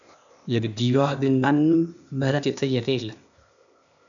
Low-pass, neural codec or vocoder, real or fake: 7.2 kHz; codec, 16 kHz, 0.8 kbps, ZipCodec; fake